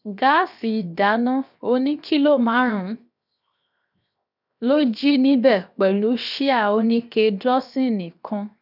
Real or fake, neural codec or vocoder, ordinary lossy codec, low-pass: fake; codec, 16 kHz, 0.7 kbps, FocalCodec; none; 5.4 kHz